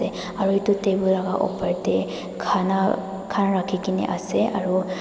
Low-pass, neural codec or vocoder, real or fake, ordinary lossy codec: none; none; real; none